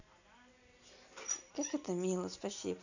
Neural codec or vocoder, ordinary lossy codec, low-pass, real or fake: none; none; 7.2 kHz; real